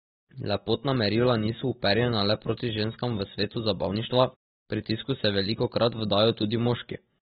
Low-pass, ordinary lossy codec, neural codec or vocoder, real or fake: 19.8 kHz; AAC, 16 kbps; none; real